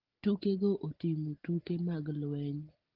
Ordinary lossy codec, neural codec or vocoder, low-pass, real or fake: Opus, 16 kbps; none; 5.4 kHz; real